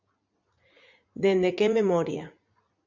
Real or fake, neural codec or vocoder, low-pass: fake; vocoder, 44.1 kHz, 80 mel bands, Vocos; 7.2 kHz